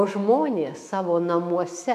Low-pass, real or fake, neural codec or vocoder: 14.4 kHz; fake; autoencoder, 48 kHz, 128 numbers a frame, DAC-VAE, trained on Japanese speech